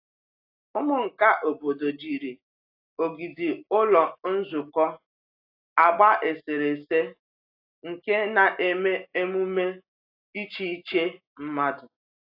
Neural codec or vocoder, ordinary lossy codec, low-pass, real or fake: none; AAC, 32 kbps; 5.4 kHz; real